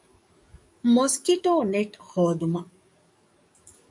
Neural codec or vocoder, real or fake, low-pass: codec, 44.1 kHz, 7.8 kbps, DAC; fake; 10.8 kHz